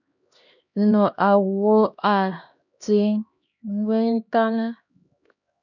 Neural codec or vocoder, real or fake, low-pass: codec, 16 kHz, 1 kbps, X-Codec, HuBERT features, trained on LibriSpeech; fake; 7.2 kHz